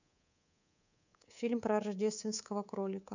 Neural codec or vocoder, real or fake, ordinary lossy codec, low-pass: codec, 24 kHz, 3.1 kbps, DualCodec; fake; none; 7.2 kHz